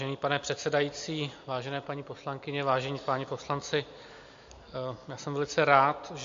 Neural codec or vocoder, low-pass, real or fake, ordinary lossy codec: none; 7.2 kHz; real; MP3, 48 kbps